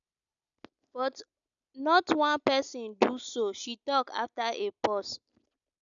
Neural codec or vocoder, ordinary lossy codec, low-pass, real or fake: none; none; 7.2 kHz; real